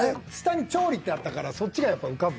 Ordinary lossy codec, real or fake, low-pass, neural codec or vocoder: none; real; none; none